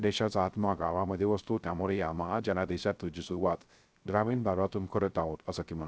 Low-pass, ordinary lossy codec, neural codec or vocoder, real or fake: none; none; codec, 16 kHz, 0.3 kbps, FocalCodec; fake